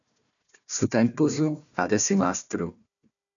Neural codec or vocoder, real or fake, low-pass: codec, 16 kHz, 1 kbps, FunCodec, trained on Chinese and English, 50 frames a second; fake; 7.2 kHz